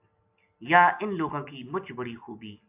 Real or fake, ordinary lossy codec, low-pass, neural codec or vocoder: real; AAC, 32 kbps; 3.6 kHz; none